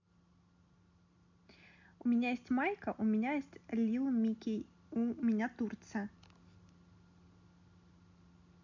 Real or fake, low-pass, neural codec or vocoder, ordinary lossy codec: real; 7.2 kHz; none; none